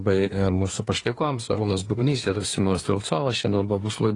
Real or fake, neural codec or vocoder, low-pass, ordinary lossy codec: fake; codec, 24 kHz, 1 kbps, SNAC; 10.8 kHz; AAC, 32 kbps